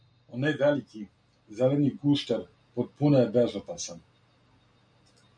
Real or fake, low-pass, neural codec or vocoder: real; 9.9 kHz; none